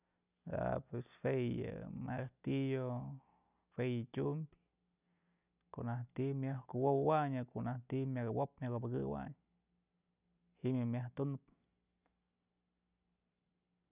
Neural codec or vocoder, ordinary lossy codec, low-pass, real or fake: none; none; 3.6 kHz; real